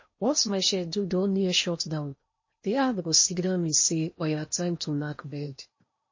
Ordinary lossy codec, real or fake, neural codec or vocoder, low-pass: MP3, 32 kbps; fake; codec, 16 kHz in and 24 kHz out, 0.8 kbps, FocalCodec, streaming, 65536 codes; 7.2 kHz